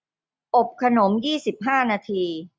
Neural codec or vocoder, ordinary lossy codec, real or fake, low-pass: none; none; real; none